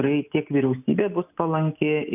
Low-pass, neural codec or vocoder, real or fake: 3.6 kHz; none; real